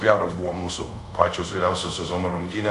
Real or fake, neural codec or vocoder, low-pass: fake; codec, 24 kHz, 0.5 kbps, DualCodec; 10.8 kHz